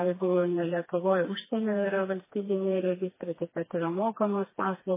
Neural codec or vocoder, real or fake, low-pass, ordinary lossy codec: codec, 16 kHz, 2 kbps, FreqCodec, smaller model; fake; 3.6 kHz; MP3, 16 kbps